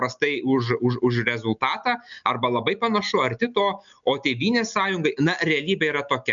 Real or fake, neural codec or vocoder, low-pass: real; none; 7.2 kHz